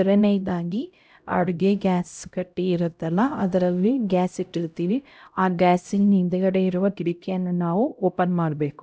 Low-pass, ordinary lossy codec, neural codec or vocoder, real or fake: none; none; codec, 16 kHz, 0.5 kbps, X-Codec, HuBERT features, trained on LibriSpeech; fake